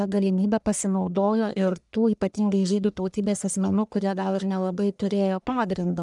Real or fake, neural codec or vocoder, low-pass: fake; codec, 44.1 kHz, 1.7 kbps, Pupu-Codec; 10.8 kHz